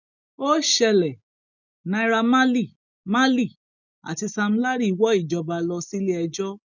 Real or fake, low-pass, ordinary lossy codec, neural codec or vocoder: real; 7.2 kHz; none; none